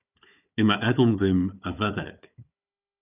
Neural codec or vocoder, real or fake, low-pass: codec, 16 kHz, 4 kbps, FunCodec, trained on Chinese and English, 50 frames a second; fake; 3.6 kHz